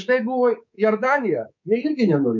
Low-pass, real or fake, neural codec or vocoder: 7.2 kHz; fake; codec, 24 kHz, 3.1 kbps, DualCodec